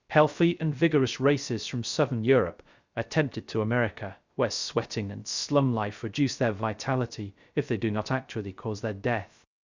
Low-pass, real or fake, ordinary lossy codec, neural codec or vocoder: 7.2 kHz; fake; Opus, 64 kbps; codec, 16 kHz, 0.3 kbps, FocalCodec